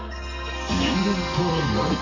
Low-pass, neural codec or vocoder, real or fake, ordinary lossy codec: 7.2 kHz; codec, 32 kHz, 1.9 kbps, SNAC; fake; none